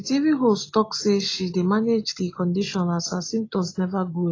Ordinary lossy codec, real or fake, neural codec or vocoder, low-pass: AAC, 32 kbps; real; none; 7.2 kHz